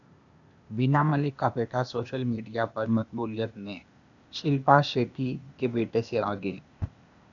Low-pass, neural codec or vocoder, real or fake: 7.2 kHz; codec, 16 kHz, 0.8 kbps, ZipCodec; fake